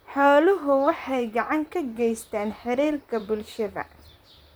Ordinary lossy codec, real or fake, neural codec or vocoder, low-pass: none; fake; vocoder, 44.1 kHz, 128 mel bands, Pupu-Vocoder; none